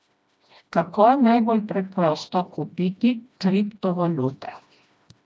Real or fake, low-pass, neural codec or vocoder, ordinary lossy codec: fake; none; codec, 16 kHz, 1 kbps, FreqCodec, smaller model; none